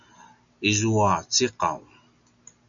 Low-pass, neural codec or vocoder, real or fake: 7.2 kHz; none; real